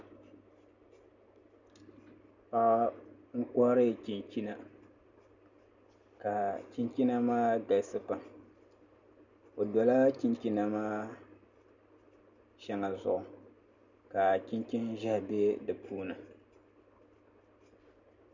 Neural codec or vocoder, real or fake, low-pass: none; real; 7.2 kHz